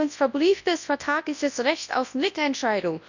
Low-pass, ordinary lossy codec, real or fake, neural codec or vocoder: 7.2 kHz; none; fake; codec, 24 kHz, 0.9 kbps, WavTokenizer, large speech release